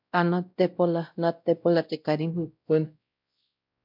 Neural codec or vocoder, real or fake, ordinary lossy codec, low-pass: codec, 16 kHz, 0.5 kbps, X-Codec, WavLM features, trained on Multilingual LibriSpeech; fake; MP3, 48 kbps; 5.4 kHz